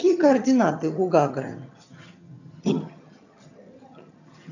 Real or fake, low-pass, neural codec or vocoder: fake; 7.2 kHz; vocoder, 22.05 kHz, 80 mel bands, HiFi-GAN